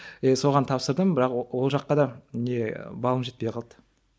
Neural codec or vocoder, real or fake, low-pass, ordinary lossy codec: none; real; none; none